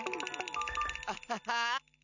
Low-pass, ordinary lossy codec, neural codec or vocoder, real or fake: 7.2 kHz; none; none; real